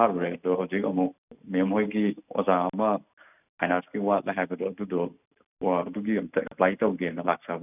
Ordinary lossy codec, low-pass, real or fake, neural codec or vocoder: none; 3.6 kHz; real; none